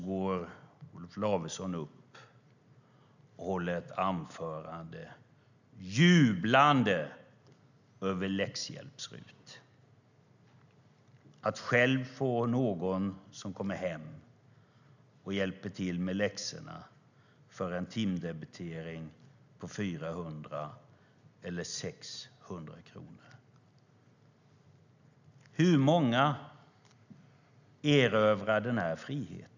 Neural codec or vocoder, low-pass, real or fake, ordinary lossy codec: none; 7.2 kHz; real; none